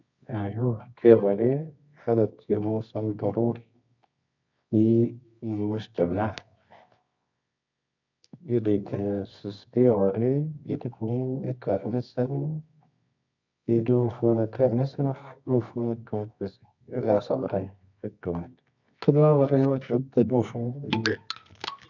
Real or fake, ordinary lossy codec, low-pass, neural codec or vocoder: fake; none; 7.2 kHz; codec, 24 kHz, 0.9 kbps, WavTokenizer, medium music audio release